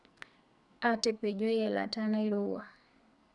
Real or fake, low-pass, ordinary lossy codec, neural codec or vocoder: fake; 10.8 kHz; none; codec, 44.1 kHz, 2.6 kbps, SNAC